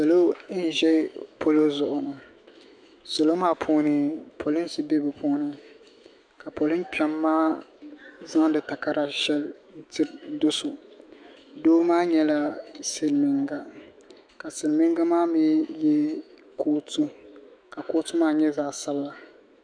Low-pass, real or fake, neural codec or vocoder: 9.9 kHz; fake; autoencoder, 48 kHz, 128 numbers a frame, DAC-VAE, trained on Japanese speech